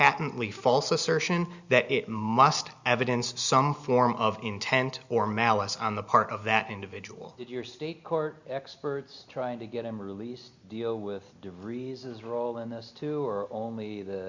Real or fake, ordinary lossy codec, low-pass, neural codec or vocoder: real; Opus, 64 kbps; 7.2 kHz; none